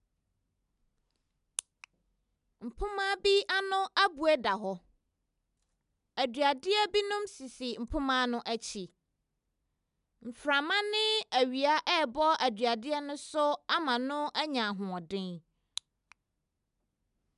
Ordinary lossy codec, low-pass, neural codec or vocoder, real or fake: none; 10.8 kHz; none; real